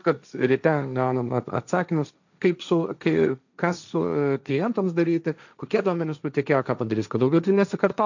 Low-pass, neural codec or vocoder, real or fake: 7.2 kHz; codec, 16 kHz, 1.1 kbps, Voila-Tokenizer; fake